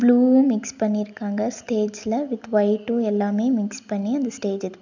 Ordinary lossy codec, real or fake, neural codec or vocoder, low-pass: none; real; none; 7.2 kHz